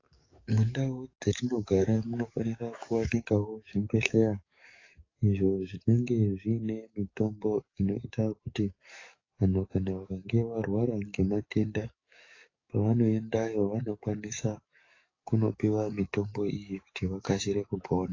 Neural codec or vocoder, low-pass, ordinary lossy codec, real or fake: codec, 44.1 kHz, 7.8 kbps, DAC; 7.2 kHz; AAC, 32 kbps; fake